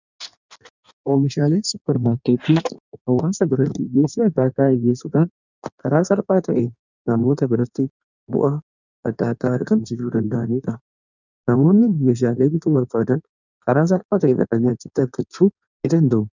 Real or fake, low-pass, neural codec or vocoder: fake; 7.2 kHz; codec, 16 kHz in and 24 kHz out, 1.1 kbps, FireRedTTS-2 codec